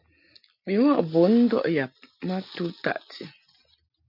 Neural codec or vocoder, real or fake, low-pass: none; real; 5.4 kHz